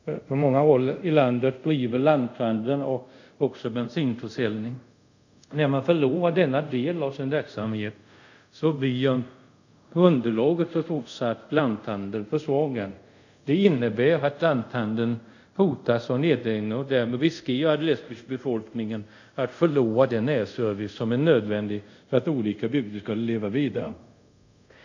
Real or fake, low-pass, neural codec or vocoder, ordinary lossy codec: fake; 7.2 kHz; codec, 24 kHz, 0.5 kbps, DualCodec; none